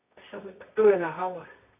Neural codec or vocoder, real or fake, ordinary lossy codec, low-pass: codec, 24 kHz, 0.9 kbps, WavTokenizer, medium music audio release; fake; none; 3.6 kHz